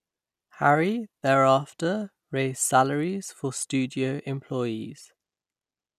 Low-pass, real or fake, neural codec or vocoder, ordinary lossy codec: 14.4 kHz; real; none; none